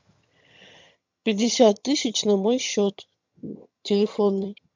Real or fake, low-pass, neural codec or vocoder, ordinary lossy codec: fake; 7.2 kHz; vocoder, 22.05 kHz, 80 mel bands, HiFi-GAN; MP3, 64 kbps